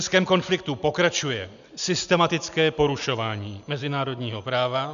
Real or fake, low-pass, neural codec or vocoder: real; 7.2 kHz; none